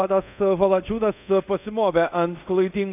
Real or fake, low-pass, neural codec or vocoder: fake; 3.6 kHz; codec, 24 kHz, 0.5 kbps, DualCodec